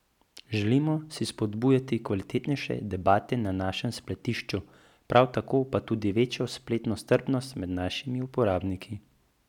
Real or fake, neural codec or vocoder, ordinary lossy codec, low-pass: real; none; none; 19.8 kHz